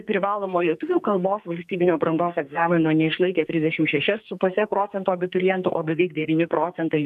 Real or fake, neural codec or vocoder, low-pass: fake; codec, 44.1 kHz, 2.6 kbps, SNAC; 14.4 kHz